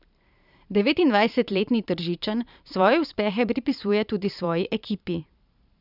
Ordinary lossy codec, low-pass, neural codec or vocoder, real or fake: none; 5.4 kHz; vocoder, 44.1 kHz, 128 mel bands every 512 samples, BigVGAN v2; fake